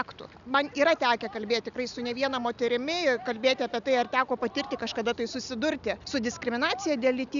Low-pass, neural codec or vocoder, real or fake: 7.2 kHz; none; real